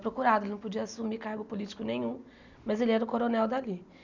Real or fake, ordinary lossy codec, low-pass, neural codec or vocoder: real; none; 7.2 kHz; none